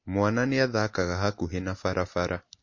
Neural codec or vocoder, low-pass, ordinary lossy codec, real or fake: none; 7.2 kHz; MP3, 32 kbps; real